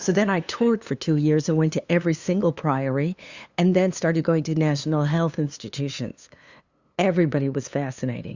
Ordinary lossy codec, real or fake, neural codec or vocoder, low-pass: Opus, 64 kbps; fake; codec, 16 kHz, 2 kbps, FunCodec, trained on LibriTTS, 25 frames a second; 7.2 kHz